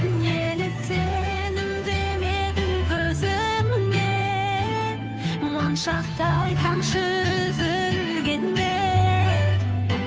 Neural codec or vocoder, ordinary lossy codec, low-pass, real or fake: codec, 16 kHz, 2 kbps, FunCodec, trained on Chinese and English, 25 frames a second; none; none; fake